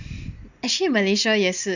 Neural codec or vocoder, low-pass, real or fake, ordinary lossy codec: none; 7.2 kHz; real; none